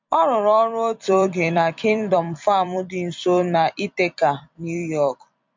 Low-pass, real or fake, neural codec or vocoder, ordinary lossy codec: 7.2 kHz; fake; vocoder, 44.1 kHz, 128 mel bands every 256 samples, BigVGAN v2; MP3, 64 kbps